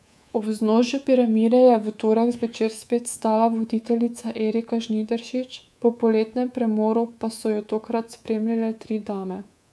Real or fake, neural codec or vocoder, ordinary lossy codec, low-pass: fake; codec, 24 kHz, 3.1 kbps, DualCodec; none; none